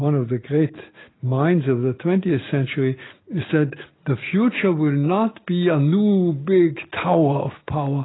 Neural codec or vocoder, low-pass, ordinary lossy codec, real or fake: none; 7.2 kHz; AAC, 16 kbps; real